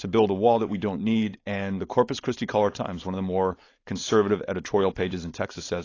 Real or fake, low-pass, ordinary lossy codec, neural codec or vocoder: fake; 7.2 kHz; AAC, 32 kbps; codec, 16 kHz, 4.8 kbps, FACodec